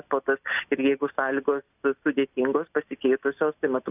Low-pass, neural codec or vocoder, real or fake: 3.6 kHz; none; real